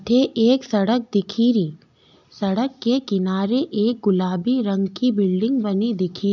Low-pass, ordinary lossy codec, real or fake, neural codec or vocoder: 7.2 kHz; none; real; none